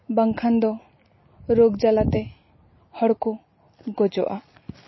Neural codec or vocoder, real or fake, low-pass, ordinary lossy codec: none; real; 7.2 kHz; MP3, 24 kbps